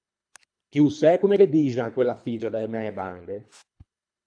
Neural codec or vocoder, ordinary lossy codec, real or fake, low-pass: codec, 24 kHz, 3 kbps, HILCodec; AAC, 64 kbps; fake; 9.9 kHz